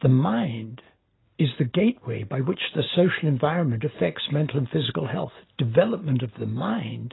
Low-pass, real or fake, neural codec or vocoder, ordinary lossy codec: 7.2 kHz; real; none; AAC, 16 kbps